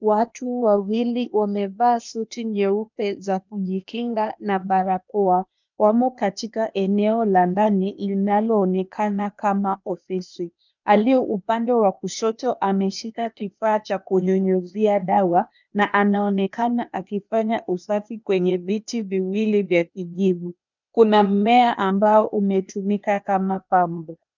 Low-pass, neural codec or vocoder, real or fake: 7.2 kHz; codec, 16 kHz, 0.8 kbps, ZipCodec; fake